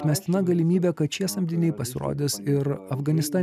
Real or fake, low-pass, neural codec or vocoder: real; 14.4 kHz; none